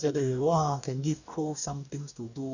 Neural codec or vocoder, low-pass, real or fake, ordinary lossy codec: codec, 44.1 kHz, 2.6 kbps, DAC; 7.2 kHz; fake; none